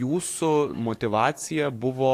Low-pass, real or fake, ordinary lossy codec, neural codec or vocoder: 14.4 kHz; fake; AAC, 64 kbps; codec, 44.1 kHz, 7.8 kbps, DAC